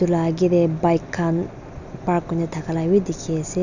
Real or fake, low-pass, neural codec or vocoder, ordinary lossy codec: real; 7.2 kHz; none; none